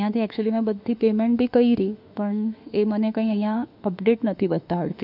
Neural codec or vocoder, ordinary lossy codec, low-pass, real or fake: autoencoder, 48 kHz, 32 numbers a frame, DAC-VAE, trained on Japanese speech; none; 5.4 kHz; fake